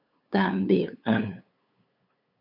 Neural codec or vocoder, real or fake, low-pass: codec, 16 kHz, 8 kbps, FunCodec, trained on LibriTTS, 25 frames a second; fake; 5.4 kHz